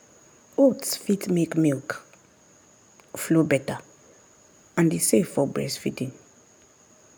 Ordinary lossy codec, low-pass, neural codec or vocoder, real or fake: none; none; none; real